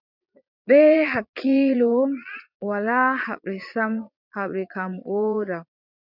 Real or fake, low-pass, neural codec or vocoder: fake; 5.4 kHz; vocoder, 22.05 kHz, 80 mel bands, Vocos